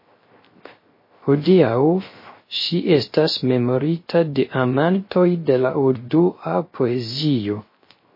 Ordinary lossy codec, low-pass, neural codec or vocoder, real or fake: MP3, 24 kbps; 5.4 kHz; codec, 16 kHz, 0.3 kbps, FocalCodec; fake